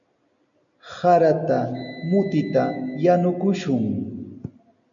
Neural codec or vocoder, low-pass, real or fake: none; 7.2 kHz; real